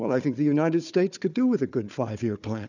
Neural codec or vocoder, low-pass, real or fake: codec, 16 kHz, 4 kbps, FunCodec, trained on Chinese and English, 50 frames a second; 7.2 kHz; fake